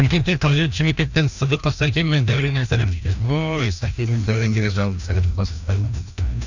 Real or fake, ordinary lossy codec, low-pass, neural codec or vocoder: fake; none; 7.2 kHz; codec, 16 kHz, 1 kbps, FreqCodec, larger model